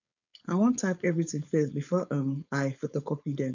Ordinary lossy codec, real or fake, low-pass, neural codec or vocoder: none; fake; 7.2 kHz; codec, 16 kHz, 4.8 kbps, FACodec